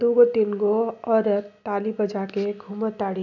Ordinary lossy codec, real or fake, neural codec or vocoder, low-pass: none; real; none; 7.2 kHz